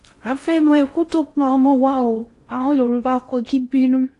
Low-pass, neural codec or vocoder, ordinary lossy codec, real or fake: 10.8 kHz; codec, 16 kHz in and 24 kHz out, 0.6 kbps, FocalCodec, streaming, 4096 codes; AAC, 48 kbps; fake